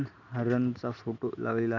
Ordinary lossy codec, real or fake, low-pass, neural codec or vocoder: none; real; 7.2 kHz; none